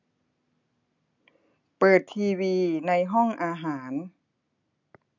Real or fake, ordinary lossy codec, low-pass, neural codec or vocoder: real; none; 7.2 kHz; none